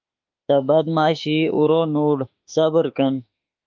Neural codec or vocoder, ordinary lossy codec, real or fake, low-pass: autoencoder, 48 kHz, 32 numbers a frame, DAC-VAE, trained on Japanese speech; Opus, 24 kbps; fake; 7.2 kHz